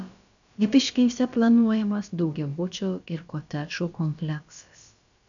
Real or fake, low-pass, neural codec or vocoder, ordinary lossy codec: fake; 7.2 kHz; codec, 16 kHz, about 1 kbps, DyCAST, with the encoder's durations; MP3, 96 kbps